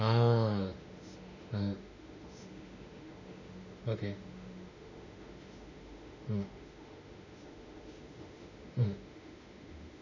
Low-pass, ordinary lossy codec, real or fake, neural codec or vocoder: 7.2 kHz; none; fake; autoencoder, 48 kHz, 32 numbers a frame, DAC-VAE, trained on Japanese speech